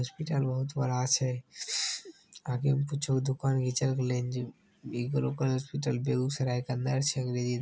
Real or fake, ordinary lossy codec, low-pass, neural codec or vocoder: real; none; none; none